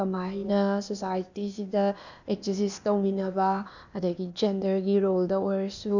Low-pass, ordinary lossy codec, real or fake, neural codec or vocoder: 7.2 kHz; none; fake; codec, 16 kHz, 0.8 kbps, ZipCodec